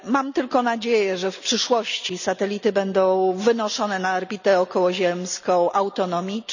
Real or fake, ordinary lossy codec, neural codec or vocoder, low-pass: real; none; none; 7.2 kHz